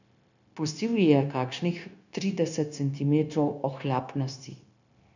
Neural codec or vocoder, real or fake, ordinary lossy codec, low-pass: codec, 16 kHz, 0.9 kbps, LongCat-Audio-Codec; fake; none; 7.2 kHz